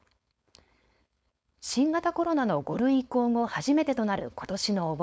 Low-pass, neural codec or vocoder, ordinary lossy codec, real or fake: none; codec, 16 kHz, 4.8 kbps, FACodec; none; fake